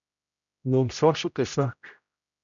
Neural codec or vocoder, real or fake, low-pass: codec, 16 kHz, 0.5 kbps, X-Codec, HuBERT features, trained on general audio; fake; 7.2 kHz